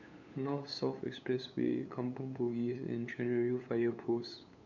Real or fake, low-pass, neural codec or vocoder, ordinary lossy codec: fake; 7.2 kHz; codec, 16 kHz, 8 kbps, FunCodec, trained on LibriTTS, 25 frames a second; none